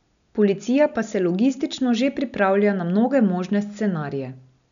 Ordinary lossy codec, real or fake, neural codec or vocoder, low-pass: none; real; none; 7.2 kHz